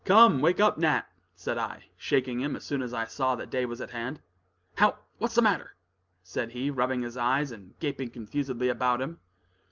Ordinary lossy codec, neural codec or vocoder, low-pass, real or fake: Opus, 24 kbps; none; 7.2 kHz; real